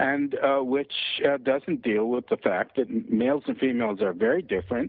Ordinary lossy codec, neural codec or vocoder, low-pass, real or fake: Opus, 24 kbps; none; 5.4 kHz; real